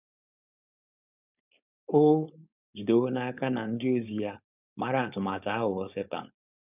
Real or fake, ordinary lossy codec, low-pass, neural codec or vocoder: fake; none; 3.6 kHz; codec, 16 kHz, 4.8 kbps, FACodec